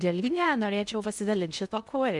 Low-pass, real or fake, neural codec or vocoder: 10.8 kHz; fake; codec, 16 kHz in and 24 kHz out, 0.6 kbps, FocalCodec, streaming, 2048 codes